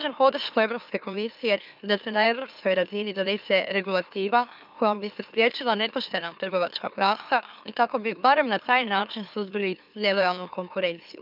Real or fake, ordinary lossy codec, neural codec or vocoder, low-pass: fake; none; autoencoder, 44.1 kHz, a latent of 192 numbers a frame, MeloTTS; 5.4 kHz